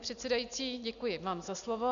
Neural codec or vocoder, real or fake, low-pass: none; real; 7.2 kHz